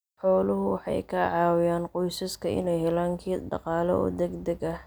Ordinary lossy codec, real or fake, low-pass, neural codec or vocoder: none; real; none; none